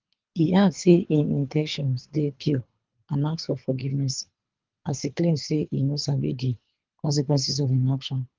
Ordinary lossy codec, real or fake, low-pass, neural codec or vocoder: Opus, 32 kbps; fake; 7.2 kHz; codec, 24 kHz, 3 kbps, HILCodec